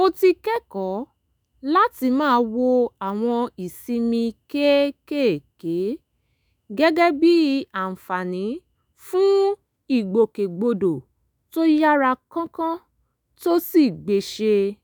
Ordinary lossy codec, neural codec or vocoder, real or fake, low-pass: none; autoencoder, 48 kHz, 128 numbers a frame, DAC-VAE, trained on Japanese speech; fake; none